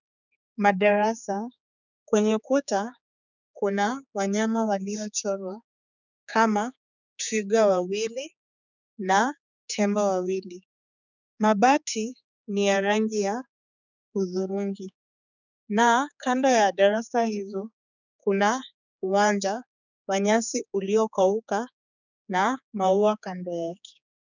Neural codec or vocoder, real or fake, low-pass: codec, 16 kHz, 4 kbps, X-Codec, HuBERT features, trained on general audio; fake; 7.2 kHz